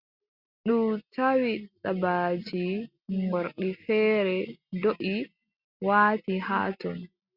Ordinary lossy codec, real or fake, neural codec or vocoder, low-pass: Opus, 64 kbps; real; none; 5.4 kHz